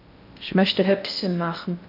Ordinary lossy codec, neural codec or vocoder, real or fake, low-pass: none; codec, 16 kHz in and 24 kHz out, 0.6 kbps, FocalCodec, streaming, 4096 codes; fake; 5.4 kHz